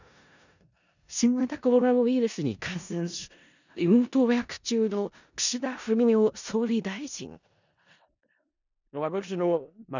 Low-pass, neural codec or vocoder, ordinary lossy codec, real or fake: 7.2 kHz; codec, 16 kHz in and 24 kHz out, 0.4 kbps, LongCat-Audio-Codec, four codebook decoder; none; fake